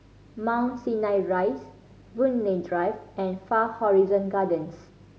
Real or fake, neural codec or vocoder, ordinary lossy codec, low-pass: real; none; none; none